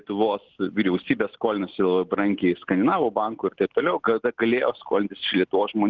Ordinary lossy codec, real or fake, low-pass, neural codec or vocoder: Opus, 16 kbps; real; 7.2 kHz; none